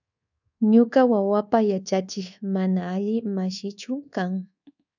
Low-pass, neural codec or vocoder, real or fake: 7.2 kHz; codec, 24 kHz, 1.2 kbps, DualCodec; fake